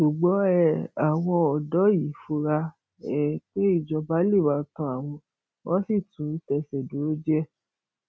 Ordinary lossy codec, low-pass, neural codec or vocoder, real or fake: none; none; none; real